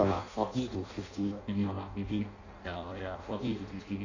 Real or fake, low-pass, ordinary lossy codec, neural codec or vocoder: fake; 7.2 kHz; none; codec, 16 kHz in and 24 kHz out, 0.6 kbps, FireRedTTS-2 codec